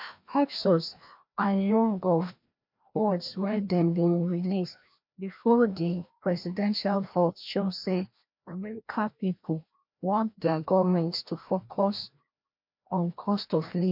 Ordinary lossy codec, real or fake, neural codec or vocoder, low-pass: MP3, 48 kbps; fake; codec, 16 kHz, 1 kbps, FreqCodec, larger model; 5.4 kHz